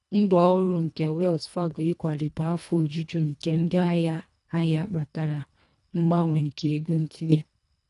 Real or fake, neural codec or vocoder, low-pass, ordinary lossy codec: fake; codec, 24 kHz, 1.5 kbps, HILCodec; 10.8 kHz; none